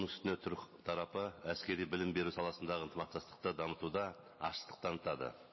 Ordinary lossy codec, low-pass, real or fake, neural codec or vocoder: MP3, 24 kbps; 7.2 kHz; real; none